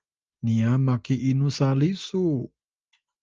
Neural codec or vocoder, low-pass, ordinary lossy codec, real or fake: none; 7.2 kHz; Opus, 24 kbps; real